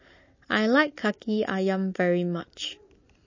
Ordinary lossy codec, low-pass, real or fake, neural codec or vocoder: MP3, 32 kbps; 7.2 kHz; real; none